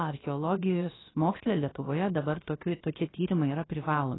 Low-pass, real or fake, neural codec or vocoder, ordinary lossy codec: 7.2 kHz; fake; codec, 16 kHz, about 1 kbps, DyCAST, with the encoder's durations; AAC, 16 kbps